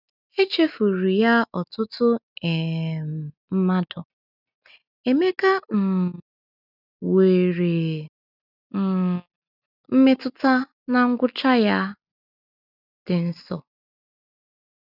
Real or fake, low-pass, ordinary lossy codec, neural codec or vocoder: real; 5.4 kHz; none; none